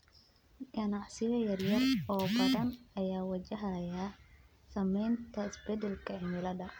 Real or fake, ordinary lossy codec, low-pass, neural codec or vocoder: real; none; none; none